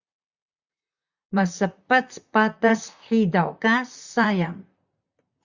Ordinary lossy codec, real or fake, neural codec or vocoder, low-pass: Opus, 64 kbps; fake; vocoder, 44.1 kHz, 128 mel bands, Pupu-Vocoder; 7.2 kHz